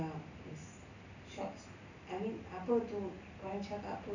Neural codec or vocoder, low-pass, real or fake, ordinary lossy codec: none; 7.2 kHz; real; none